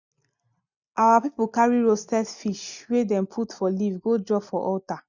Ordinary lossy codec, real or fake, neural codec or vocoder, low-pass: none; real; none; 7.2 kHz